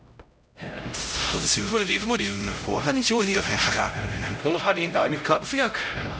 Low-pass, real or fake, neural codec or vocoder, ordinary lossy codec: none; fake; codec, 16 kHz, 0.5 kbps, X-Codec, HuBERT features, trained on LibriSpeech; none